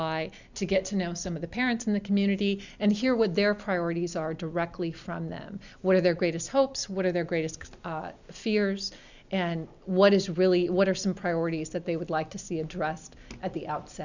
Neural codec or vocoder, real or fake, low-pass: none; real; 7.2 kHz